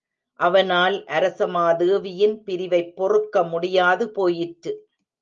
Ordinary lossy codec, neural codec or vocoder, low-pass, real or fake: Opus, 32 kbps; none; 7.2 kHz; real